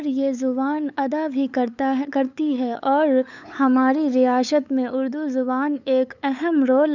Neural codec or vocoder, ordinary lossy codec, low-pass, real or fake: codec, 16 kHz, 8 kbps, FunCodec, trained on LibriTTS, 25 frames a second; none; 7.2 kHz; fake